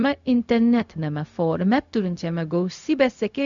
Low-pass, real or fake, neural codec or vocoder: 7.2 kHz; fake; codec, 16 kHz, 0.4 kbps, LongCat-Audio-Codec